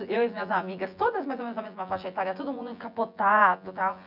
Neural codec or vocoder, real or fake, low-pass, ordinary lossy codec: vocoder, 24 kHz, 100 mel bands, Vocos; fake; 5.4 kHz; none